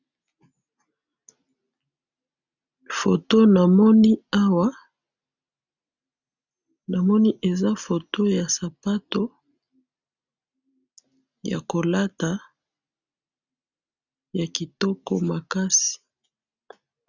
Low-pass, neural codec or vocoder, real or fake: 7.2 kHz; none; real